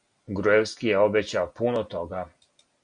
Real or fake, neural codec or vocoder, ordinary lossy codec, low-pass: real; none; MP3, 96 kbps; 9.9 kHz